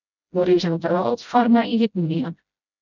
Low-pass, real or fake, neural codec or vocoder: 7.2 kHz; fake; codec, 16 kHz, 0.5 kbps, FreqCodec, smaller model